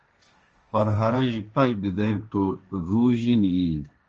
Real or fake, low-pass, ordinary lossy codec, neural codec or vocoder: fake; 7.2 kHz; Opus, 24 kbps; codec, 16 kHz, 1.1 kbps, Voila-Tokenizer